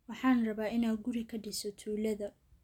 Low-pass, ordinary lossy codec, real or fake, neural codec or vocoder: 19.8 kHz; none; real; none